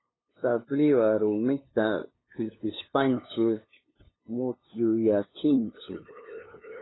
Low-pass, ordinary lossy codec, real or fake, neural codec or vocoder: 7.2 kHz; AAC, 16 kbps; fake; codec, 16 kHz, 2 kbps, FunCodec, trained on LibriTTS, 25 frames a second